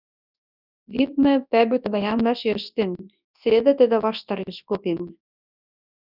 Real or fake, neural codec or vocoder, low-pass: fake; codec, 24 kHz, 0.9 kbps, WavTokenizer, large speech release; 5.4 kHz